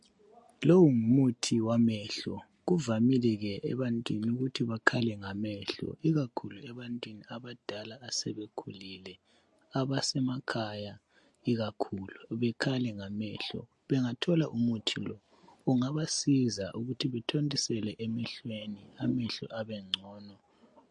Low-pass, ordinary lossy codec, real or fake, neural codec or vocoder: 10.8 kHz; MP3, 48 kbps; real; none